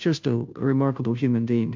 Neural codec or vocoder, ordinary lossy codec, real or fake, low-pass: codec, 16 kHz, 0.5 kbps, FunCodec, trained on Chinese and English, 25 frames a second; MP3, 64 kbps; fake; 7.2 kHz